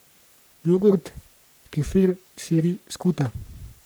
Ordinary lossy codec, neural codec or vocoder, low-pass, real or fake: none; codec, 44.1 kHz, 3.4 kbps, Pupu-Codec; none; fake